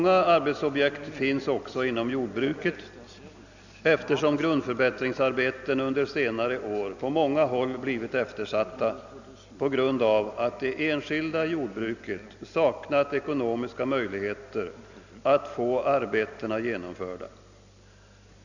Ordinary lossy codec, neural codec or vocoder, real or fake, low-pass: none; none; real; 7.2 kHz